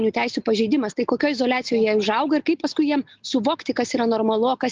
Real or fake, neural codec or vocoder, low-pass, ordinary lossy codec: real; none; 7.2 kHz; Opus, 24 kbps